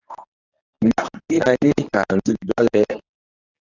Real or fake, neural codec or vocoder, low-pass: fake; codec, 24 kHz, 0.9 kbps, WavTokenizer, medium speech release version 1; 7.2 kHz